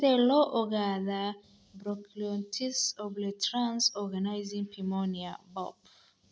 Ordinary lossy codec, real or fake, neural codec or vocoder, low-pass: none; real; none; none